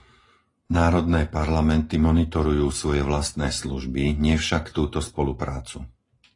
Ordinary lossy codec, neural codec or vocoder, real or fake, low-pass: AAC, 32 kbps; none; real; 10.8 kHz